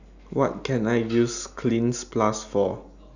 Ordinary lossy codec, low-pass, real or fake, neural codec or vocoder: none; 7.2 kHz; real; none